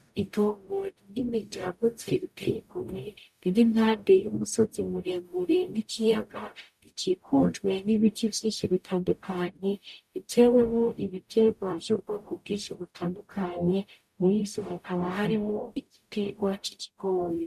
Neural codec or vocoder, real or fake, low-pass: codec, 44.1 kHz, 0.9 kbps, DAC; fake; 14.4 kHz